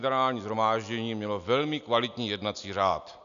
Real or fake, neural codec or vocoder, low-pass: real; none; 7.2 kHz